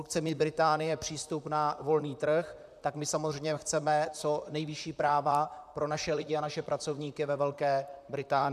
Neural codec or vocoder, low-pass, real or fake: vocoder, 44.1 kHz, 128 mel bands, Pupu-Vocoder; 14.4 kHz; fake